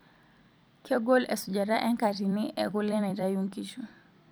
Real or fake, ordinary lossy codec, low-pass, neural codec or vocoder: fake; none; none; vocoder, 44.1 kHz, 128 mel bands every 256 samples, BigVGAN v2